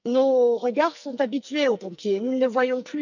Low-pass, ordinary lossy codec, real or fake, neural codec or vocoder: 7.2 kHz; none; fake; codec, 44.1 kHz, 2.6 kbps, SNAC